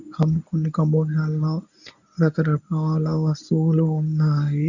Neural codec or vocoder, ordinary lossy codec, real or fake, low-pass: codec, 24 kHz, 0.9 kbps, WavTokenizer, medium speech release version 1; none; fake; 7.2 kHz